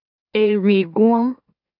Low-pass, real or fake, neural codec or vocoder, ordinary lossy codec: 5.4 kHz; fake; autoencoder, 44.1 kHz, a latent of 192 numbers a frame, MeloTTS; AAC, 48 kbps